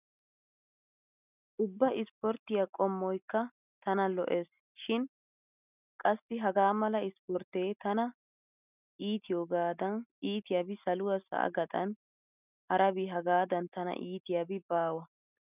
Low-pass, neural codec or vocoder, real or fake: 3.6 kHz; none; real